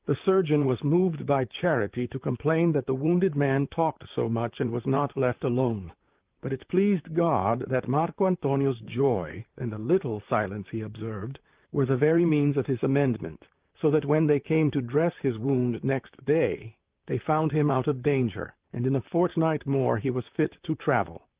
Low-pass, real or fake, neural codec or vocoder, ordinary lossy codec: 3.6 kHz; fake; vocoder, 44.1 kHz, 128 mel bands, Pupu-Vocoder; Opus, 32 kbps